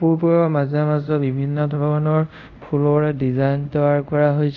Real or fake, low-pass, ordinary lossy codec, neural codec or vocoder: fake; 7.2 kHz; none; codec, 24 kHz, 0.5 kbps, DualCodec